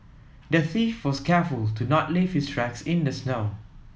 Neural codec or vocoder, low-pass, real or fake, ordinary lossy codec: none; none; real; none